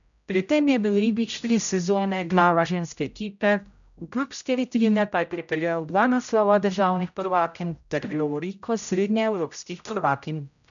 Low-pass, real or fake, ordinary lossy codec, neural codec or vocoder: 7.2 kHz; fake; none; codec, 16 kHz, 0.5 kbps, X-Codec, HuBERT features, trained on general audio